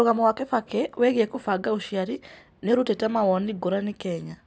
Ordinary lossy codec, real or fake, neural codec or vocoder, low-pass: none; real; none; none